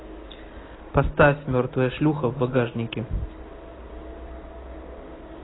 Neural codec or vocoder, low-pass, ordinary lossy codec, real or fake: none; 7.2 kHz; AAC, 16 kbps; real